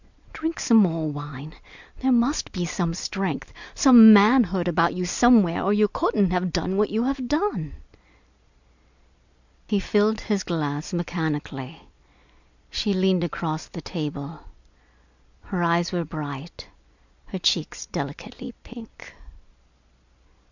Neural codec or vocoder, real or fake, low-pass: none; real; 7.2 kHz